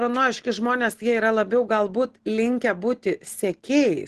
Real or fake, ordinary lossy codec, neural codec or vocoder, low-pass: real; Opus, 16 kbps; none; 10.8 kHz